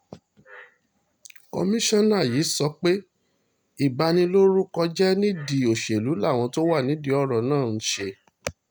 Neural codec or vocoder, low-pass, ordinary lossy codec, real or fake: none; none; none; real